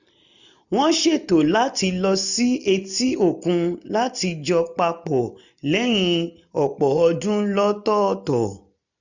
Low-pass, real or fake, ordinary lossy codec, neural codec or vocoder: 7.2 kHz; real; none; none